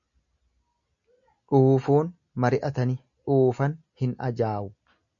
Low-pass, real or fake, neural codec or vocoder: 7.2 kHz; real; none